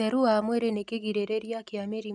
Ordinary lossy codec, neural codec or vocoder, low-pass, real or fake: none; none; 9.9 kHz; real